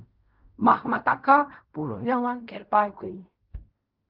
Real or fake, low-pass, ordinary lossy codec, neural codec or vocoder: fake; 5.4 kHz; Opus, 24 kbps; codec, 16 kHz in and 24 kHz out, 0.4 kbps, LongCat-Audio-Codec, fine tuned four codebook decoder